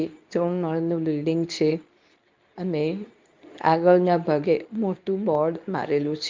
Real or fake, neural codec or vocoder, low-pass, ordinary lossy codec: fake; codec, 24 kHz, 0.9 kbps, WavTokenizer, medium speech release version 2; 7.2 kHz; Opus, 24 kbps